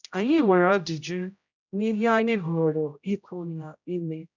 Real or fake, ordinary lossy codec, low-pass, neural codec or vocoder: fake; none; 7.2 kHz; codec, 16 kHz, 0.5 kbps, X-Codec, HuBERT features, trained on general audio